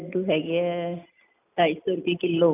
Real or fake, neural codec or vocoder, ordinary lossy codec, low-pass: real; none; none; 3.6 kHz